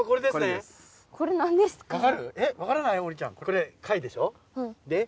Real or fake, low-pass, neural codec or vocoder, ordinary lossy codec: real; none; none; none